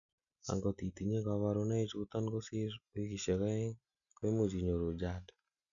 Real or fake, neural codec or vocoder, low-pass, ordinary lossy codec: real; none; 7.2 kHz; none